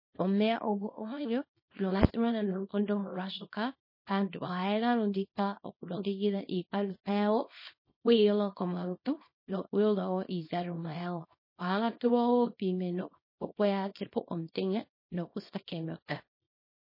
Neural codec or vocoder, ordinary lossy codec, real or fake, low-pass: codec, 24 kHz, 0.9 kbps, WavTokenizer, small release; MP3, 24 kbps; fake; 5.4 kHz